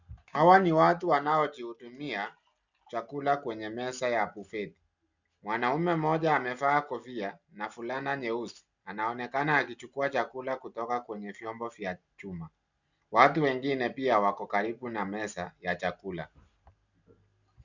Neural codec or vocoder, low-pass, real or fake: none; 7.2 kHz; real